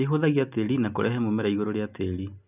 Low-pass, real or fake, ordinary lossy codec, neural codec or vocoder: 3.6 kHz; real; none; none